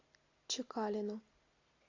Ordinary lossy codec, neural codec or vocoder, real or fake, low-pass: AAC, 32 kbps; none; real; 7.2 kHz